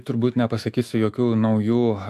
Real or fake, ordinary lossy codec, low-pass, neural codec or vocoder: fake; AAC, 96 kbps; 14.4 kHz; autoencoder, 48 kHz, 128 numbers a frame, DAC-VAE, trained on Japanese speech